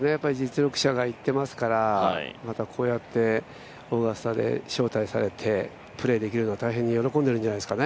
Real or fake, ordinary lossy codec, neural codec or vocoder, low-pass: real; none; none; none